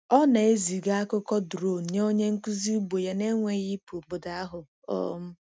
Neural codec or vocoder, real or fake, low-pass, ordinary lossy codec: none; real; none; none